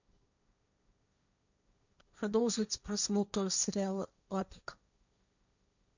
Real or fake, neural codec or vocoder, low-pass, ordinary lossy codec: fake; codec, 16 kHz, 1.1 kbps, Voila-Tokenizer; 7.2 kHz; none